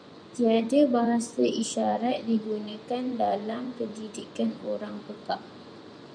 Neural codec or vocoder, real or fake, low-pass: vocoder, 24 kHz, 100 mel bands, Vocos; fake; 9.9 kHz